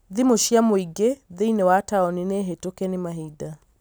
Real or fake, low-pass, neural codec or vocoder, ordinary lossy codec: real; none; none; none